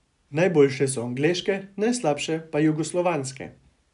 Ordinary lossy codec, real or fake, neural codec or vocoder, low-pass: AAC, 96 kbps; real; none; 10.8 kHz